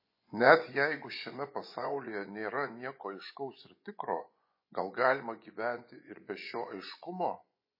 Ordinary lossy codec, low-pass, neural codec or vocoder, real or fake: MP3, 24 kbps; 5.4 kHz; vocoder, 24 kHz, 100 mel bands, Vocos; fake